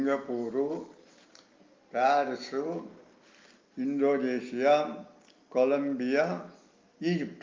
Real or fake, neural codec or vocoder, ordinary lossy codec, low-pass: real; none; Opus, 24 kbps; 7.2 kHz